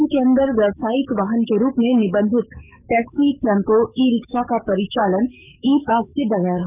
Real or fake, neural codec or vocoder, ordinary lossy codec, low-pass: fake; codec, 44.1 kHz, 7.8 kbps, DAC; none; 3.6 kHz